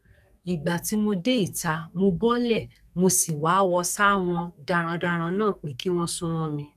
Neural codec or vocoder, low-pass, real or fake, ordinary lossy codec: codec, 44.1 kHz, 2.6 kbps, SNAC; 14.4 kHz; fake; none